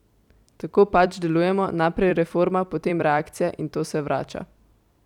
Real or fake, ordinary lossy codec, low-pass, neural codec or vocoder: fake; none; 19.8 kHz; vocoder, 44.1 kHz, 128 mel bands every 256 samples, BigVGAN v2